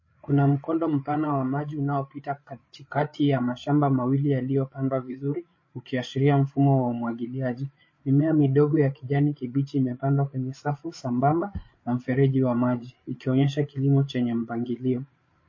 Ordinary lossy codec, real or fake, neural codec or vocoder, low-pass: MP3, 32 kbps; fake; codec, 16 kHz, 8 kbps, FreqCodec, larger model; 7.2 kHz